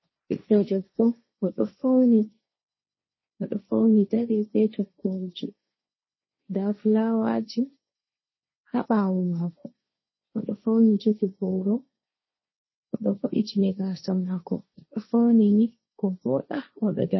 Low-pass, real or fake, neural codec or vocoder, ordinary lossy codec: 7.2 kHz; fake; codec, 16 kHz, 1.1 kbps, Voila-Tokenizer; MP3, 24 kbps